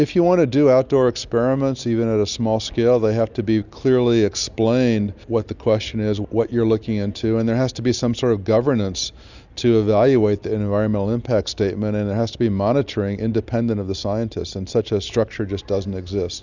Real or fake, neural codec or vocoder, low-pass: real; none; 7.2 kHz